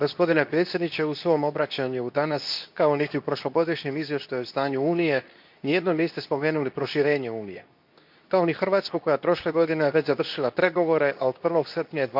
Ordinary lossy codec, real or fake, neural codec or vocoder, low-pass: none; fake; codec, 24 kHz, 0.9 kbps, WavTokenizer, medium speech release version 2; 5.4 kHz